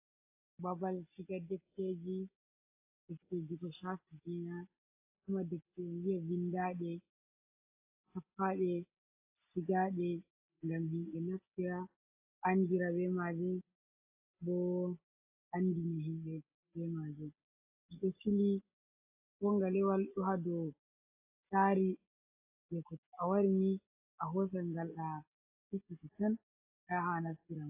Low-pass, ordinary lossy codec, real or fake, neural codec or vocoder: 3.6 kHz; AAC, 24 kbps; real; none